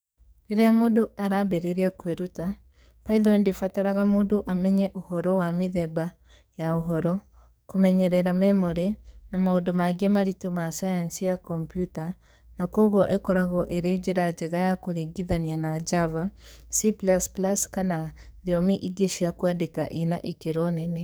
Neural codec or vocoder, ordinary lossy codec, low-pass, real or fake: codec, 44.1 kHz, 2.6 kbps, SNAC; none; none; fake